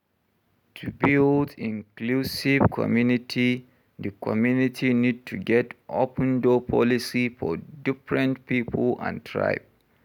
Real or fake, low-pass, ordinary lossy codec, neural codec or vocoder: real; 19.8 kHz; none; none